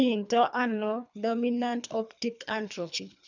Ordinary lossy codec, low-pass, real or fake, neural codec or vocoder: none; 7.2 kHz; fake; codec, 24 kHz, 3 kbps, HILCodec